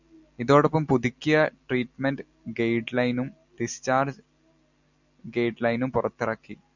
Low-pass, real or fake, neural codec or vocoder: 7.2 kHz; real; none